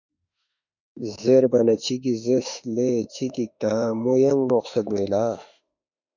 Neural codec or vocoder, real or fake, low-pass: autoencoder, 48 kHz, 32 numbers a frame, DAC-VAE, trained on Japanese speech; fake; 7.2 kHz